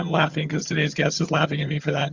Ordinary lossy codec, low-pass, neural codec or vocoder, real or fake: Opus, 64 kbps; 7.2 kHz; vocoder, 22.05 kHz, 80 mel bands, HiFi-GAN; fake